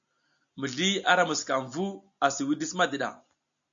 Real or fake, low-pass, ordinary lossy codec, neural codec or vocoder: real; 7.2 kHz; AAC, 64 kbps; none